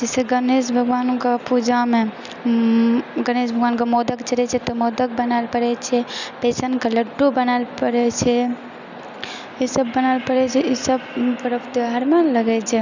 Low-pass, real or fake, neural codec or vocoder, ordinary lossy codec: 7.2 kHz; real; none; none